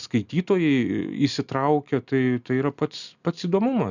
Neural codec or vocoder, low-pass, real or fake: none; 7.2 kHz; real